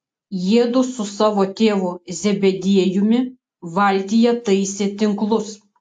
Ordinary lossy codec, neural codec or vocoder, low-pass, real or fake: AAC, 64 kbps; none; 9.9 kHz; real